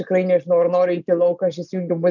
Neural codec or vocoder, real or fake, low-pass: none; real; 7.2 kHz